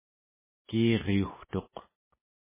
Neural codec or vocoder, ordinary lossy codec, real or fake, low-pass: none; MP3, 16 kbps; real; 3.6 kHz